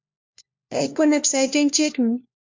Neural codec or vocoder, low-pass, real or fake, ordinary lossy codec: codec, 16 kHz, 1 kbps, FunCodec, trained on LibriTTS, 50 frames a second; 7.2 kHz; fake; MP3, 64 kbps